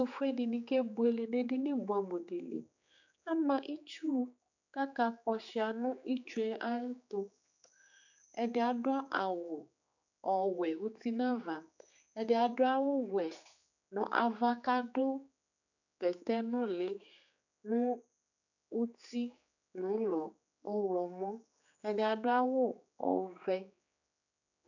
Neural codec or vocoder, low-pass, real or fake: codec, 16 kHz, 4 kbps, X-Codec, HuBERT features, trained on general audio; 7.2 kHz; fake